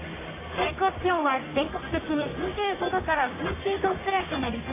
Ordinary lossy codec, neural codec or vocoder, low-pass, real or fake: AAC, 16 kbps; codec, 44.1 kHz, 1.7 kbps, Pupu-Codec; 3.6 kHz; fake